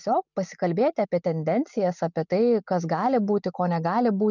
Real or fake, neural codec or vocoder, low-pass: real; none; 7.2 kHz